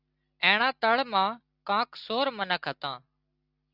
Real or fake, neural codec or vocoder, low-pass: real; none; 5.4 kHz